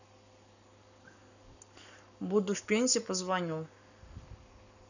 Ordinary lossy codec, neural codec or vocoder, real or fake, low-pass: none; codec, 44.1 kHz, 7.8 kbps, Pupu-Codec; fake; 7.2 kHz